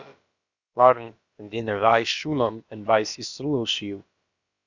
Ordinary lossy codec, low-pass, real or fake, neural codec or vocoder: Opus, 64 kbps; 7.2 kHz; fake; codec, 16 kHz, about 1 kbps, DyCAST, with the encoder's durations